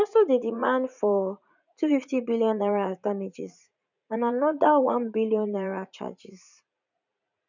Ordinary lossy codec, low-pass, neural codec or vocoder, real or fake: none; 7.2 kHz; vocoder, 44.1 kHz, 80 mel bands, Vocos; fake